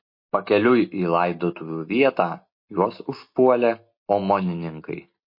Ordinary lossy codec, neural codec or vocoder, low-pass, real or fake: MP3, 32 kbps; none; 5.4 kHz; real